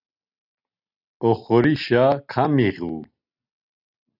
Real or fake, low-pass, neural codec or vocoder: real; 5.4 kHz; none